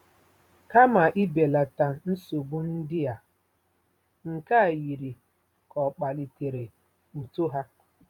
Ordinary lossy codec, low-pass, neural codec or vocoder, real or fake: none; 19.8 kHz; vocoder, 44.1 kHz, 128 mel bands every 512 samples, BigVGAN v2; fake